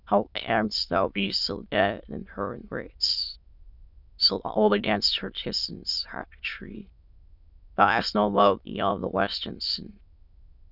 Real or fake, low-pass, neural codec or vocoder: fake; 5.4 kHz; autoencoder, 22.05 kHz, a latent of 192 numbers a frame, VITS, trained on many speakers